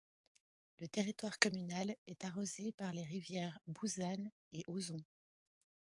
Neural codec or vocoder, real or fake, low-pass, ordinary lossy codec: codec, 44.1 kHz, 7.8 kbps, DAC; fake; 10.8 kHz; AAC, 64 kbps